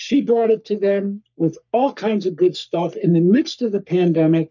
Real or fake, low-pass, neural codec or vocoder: fake; 7.2 kHz; codec, 44.1 kHz, 3.4 kbps, Pupu-Codec